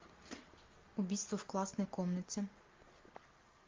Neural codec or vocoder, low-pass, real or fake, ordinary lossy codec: none; 7.2 kHz; real; Opus, 32 kbps